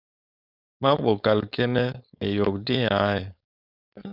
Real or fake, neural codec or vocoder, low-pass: fake; codec, 16 kHz, 4.8 kbps, FACodec; 5.4 kHz